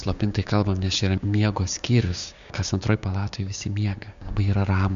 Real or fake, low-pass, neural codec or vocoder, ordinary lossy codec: fake; 7.2 kHz; codec, 16 kHz, 6 kbps, DAC; Opus, 64 kbps